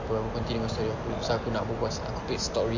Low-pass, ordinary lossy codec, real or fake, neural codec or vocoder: 7.2 kHz; AAC, 48 kbps; real; none